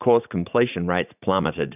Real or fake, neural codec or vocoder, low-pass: fake; vocoder, 44.1 kHz, 80 mel bands, Vocos; 3.6 kHz